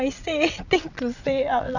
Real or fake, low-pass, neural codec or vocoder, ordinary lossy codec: fake; 7.2 kHz; vocoder, 22.05 kHz, 80 mel bands, WaveNeXt; none